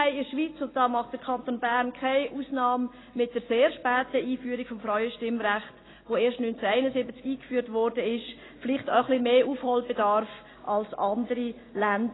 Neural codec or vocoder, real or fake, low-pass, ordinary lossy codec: none; real; 7.2 kHz; AAC, 16 kbps